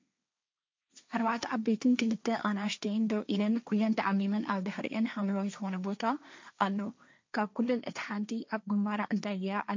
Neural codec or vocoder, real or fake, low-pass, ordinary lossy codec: codec, 16 kHz, 1.1 kbps, Voila-Tokenizer; fake; 7.2 kHz; MP3, 64 kbps